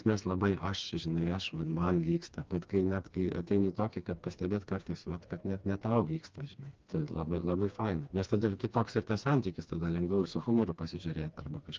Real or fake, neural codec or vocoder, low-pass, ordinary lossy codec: fake; codec, 16 kHz, 2 kbps, FreqCodec, smaller model; 7.2 kHz; Opus, 24 kbps